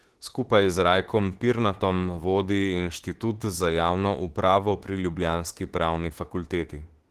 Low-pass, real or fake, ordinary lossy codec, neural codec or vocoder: 14.4 kHz; fake; Opus, 16 kbps; autoencoder, 48 kHz, 32 numbers a frame, DAC-VAE, trained on Japanese speech